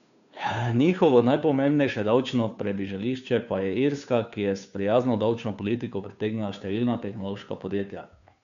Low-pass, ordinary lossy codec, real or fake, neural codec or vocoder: 7.2 kHz; none; fake; codec, 16 kHz, 2 kbps, FunCodec, trained on Chinese and English, 25 frames a second